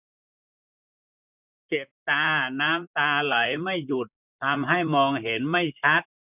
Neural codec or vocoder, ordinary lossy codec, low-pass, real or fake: vocoder, 44.1 kHz, 128 mel bands, Pupu-Vocoder; none; 3.6 kHz; fake